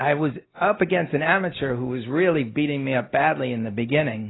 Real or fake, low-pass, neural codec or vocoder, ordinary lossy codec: real; 7.2 kHz; none; AAC, 16 kbps